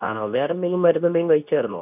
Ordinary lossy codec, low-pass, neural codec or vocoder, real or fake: none; 3.6 kHz; codec, 24 kHz, 0.9 kbps, WavTokenizer, medium speech release version 2; fake